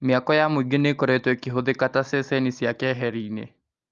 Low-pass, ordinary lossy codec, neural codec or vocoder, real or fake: 7.2 kHz; Opus, 32 kbps; none; real